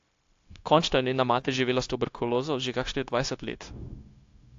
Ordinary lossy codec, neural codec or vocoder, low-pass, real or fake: AAC, 48 kbps; codec, 16 kHz, 0.9 kbps, LongCat-Audio-Codec; 7.2 kHz; fake